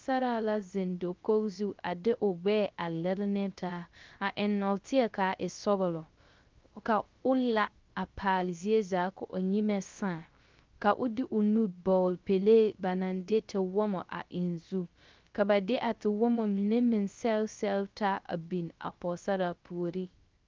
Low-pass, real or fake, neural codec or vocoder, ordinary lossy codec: 7.2 kHz; fake; codec, 16 kHz, 0.3 kbps, FocalCodec; Opus, 32 kbps